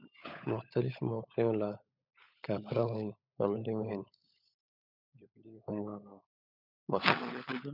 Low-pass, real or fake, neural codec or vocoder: 5.4 kHz; fake; codec, 16 kHz, 16 kbps, FunCodec, trained on LibriTTS, 50 frames a second